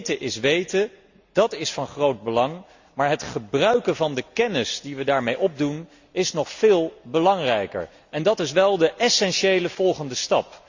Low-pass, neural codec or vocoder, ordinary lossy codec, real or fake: 7.2 kHz; none; Opus, 64 kbps; real